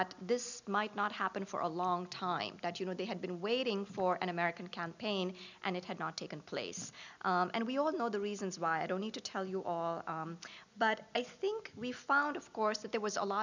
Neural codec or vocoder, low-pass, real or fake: none; 7.2 kHz; real